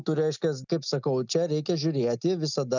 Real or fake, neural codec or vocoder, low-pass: real; none; 7.2 kHz